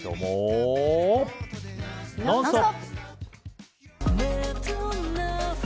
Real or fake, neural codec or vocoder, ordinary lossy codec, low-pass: real; none; none; none